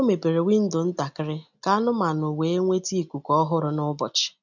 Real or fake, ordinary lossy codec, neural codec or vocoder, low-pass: real; none; none; 7.2 kHz